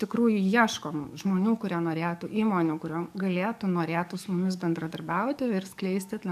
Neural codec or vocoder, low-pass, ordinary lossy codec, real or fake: codec, 44.1 kHz, 7.8 kbps, DAC; 14.4 kHz; MP3, 96 kbps; fake